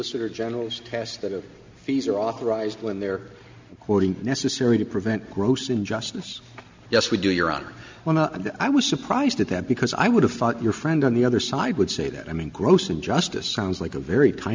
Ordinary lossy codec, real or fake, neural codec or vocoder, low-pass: MP3, 64 kbps; real; none; 7.2 kHz